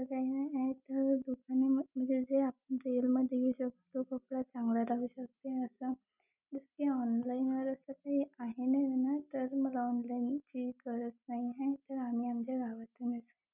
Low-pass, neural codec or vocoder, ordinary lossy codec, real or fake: 3.6 kHz; none; none; real